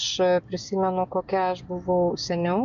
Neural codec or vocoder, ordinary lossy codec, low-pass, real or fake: none; AAC, 96 kbps; 7.2 kHz; real